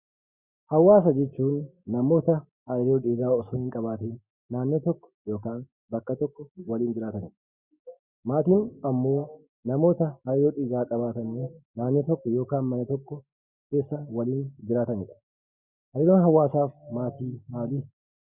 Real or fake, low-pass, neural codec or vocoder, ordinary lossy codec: real; 3.6 kHz; none; Opus, 64 kbps